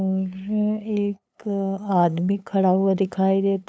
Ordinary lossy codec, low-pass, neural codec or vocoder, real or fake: none; none; codec, 16 kHz, 8 kbps, FunCodec, trained on LibriTTS, 25 frames a second; fake